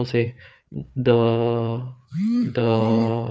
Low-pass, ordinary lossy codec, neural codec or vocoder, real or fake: none; none; codec, 16 kHz, 4 kbps, FreqCodec, larger model; fake